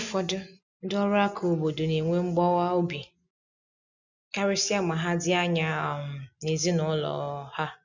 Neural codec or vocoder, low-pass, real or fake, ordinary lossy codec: none; 7.2 kHz; real; none